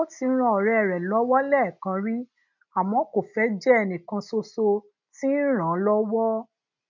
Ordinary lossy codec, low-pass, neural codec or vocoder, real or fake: MP3, 64 kbps; 7.2 kHz; none; real